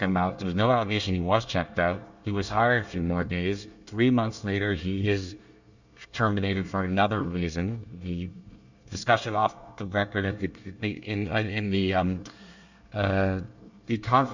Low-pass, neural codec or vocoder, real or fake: 7.2 kHz; codec, 24 kHz, 1 kbps, SNAC; fake